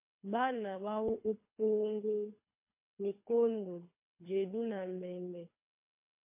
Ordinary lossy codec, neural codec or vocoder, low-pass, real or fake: MP3, 24 kbps; codec, 24 kHz, 3 kbps, HILCodec; 3.6 kHz; fake